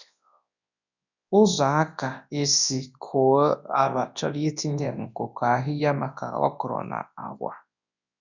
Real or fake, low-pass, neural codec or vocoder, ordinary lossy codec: fake; 7.2 kHz; codec, 24 kHz, 0.9 kbps, WavTokenizer, large speech release; none